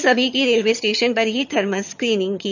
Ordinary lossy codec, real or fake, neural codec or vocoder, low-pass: none; fake; vocoder, 22.05 kHz, 80 mel bands, HiFi-GAN; 7.2 kHz